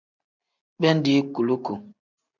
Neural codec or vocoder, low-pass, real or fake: none; 7.2 kHz; real